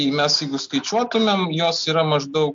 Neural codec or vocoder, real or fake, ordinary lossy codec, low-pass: none; real; MP3, 48 kbps; 7.2 kHz